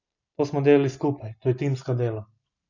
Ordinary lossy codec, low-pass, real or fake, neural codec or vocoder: none; 7.2 kHz; real; none